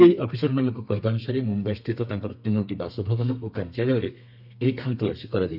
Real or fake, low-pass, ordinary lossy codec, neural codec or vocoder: fake; 5.4 kHz; none; codec, 32 kHz, 1.9 kbps, SNAC